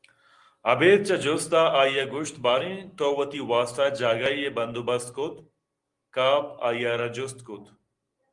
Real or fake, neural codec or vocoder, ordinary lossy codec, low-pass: real; none; Opus, 24 kbps; 10.8 kHz